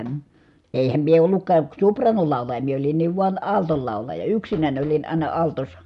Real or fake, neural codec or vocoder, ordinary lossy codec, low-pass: real; none; none; 10.8 kHz